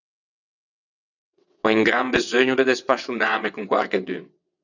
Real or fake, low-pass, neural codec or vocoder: fake; 7.2 kHz; vocoder, 22.05 kHz, 80 mel bands, WaveNeXt